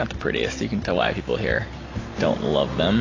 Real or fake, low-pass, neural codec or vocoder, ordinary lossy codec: real; 7.2 kHz; none; AAC, 32 kbps